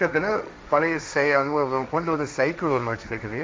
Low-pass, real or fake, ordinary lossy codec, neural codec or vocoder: none; fake; none; codec, 16 kHz, 1.1 kbps, Voila-Tokenizer